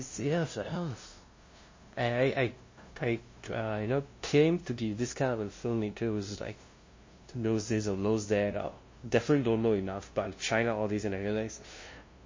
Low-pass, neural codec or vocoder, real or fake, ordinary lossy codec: 7.2 kHz; codec, 16 kHz, 0.5 kbps, FunCodec, trained on LibriTTS, 25 frames a second; fake; MP3, 32 kbps